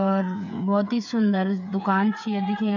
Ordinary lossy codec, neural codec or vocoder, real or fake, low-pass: none; codec, 16 kHz, 8 kbps, FreqCodec, smaller model; fake; 7.2 kHz